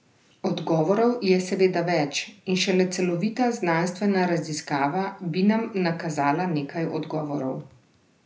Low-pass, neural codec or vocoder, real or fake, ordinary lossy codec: none; none; real; none